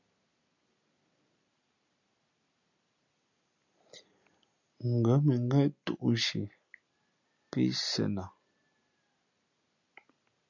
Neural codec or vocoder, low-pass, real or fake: none; 7.2 kHz; real